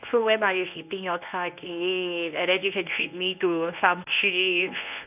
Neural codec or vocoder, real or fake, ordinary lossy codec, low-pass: codec, 24 kHz, 0.9 kbps, WavTokenizer, medium speech release version 1; fake; none; 3.6 kHz